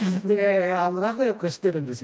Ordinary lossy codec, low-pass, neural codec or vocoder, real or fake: none; none; codec, 16 kHz, 1 kbps, FreqCodec, smaller model; fake